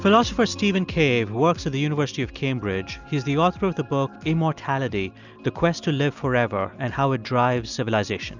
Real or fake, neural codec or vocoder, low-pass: real; none; 7.2 kHz